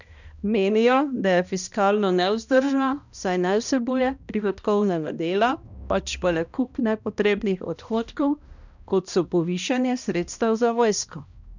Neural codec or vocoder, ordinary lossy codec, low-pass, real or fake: codec, 16 kHz, 1 kbps, X-Codec, HuBERT features, trained on balanced general audio; none; 7.2 kHz; fake